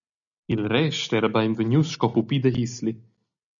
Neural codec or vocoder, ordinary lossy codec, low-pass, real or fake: none; MP3, 96 kbps; 7.2 kHz; real